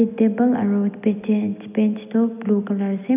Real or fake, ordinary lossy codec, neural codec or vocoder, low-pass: real; none; none; 3.6 kHz